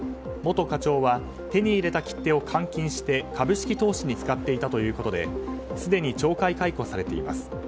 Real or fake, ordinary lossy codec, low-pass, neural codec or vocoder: real; none; none; none